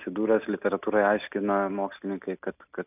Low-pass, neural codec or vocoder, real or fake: 3.6 kHz; none; real